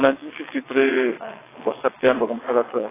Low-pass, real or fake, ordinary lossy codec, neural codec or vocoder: 3.6 kHz; fake; AAC, 16 kbps; vocoder, 22.05 kHz, 80 mel bands, WaveNeXt